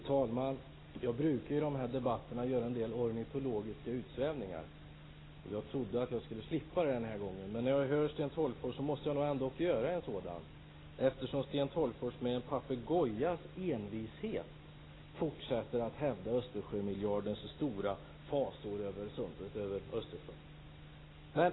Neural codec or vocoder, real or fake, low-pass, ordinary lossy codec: none; real; 7.2 kHz; AAC, 16 kbps